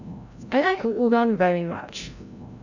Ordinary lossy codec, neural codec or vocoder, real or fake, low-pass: none; codec, 16 kHz, 0.5 kbps, FreqCodec, larger model; fake; 7.2 kHz